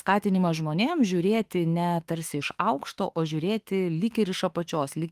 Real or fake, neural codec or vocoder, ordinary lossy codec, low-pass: fake; autoencoder, 48 kHz, 32 numbers a frame, DAC-VAE, trained on Japanese speech; Opus, 24 kbps; 14.4 kHz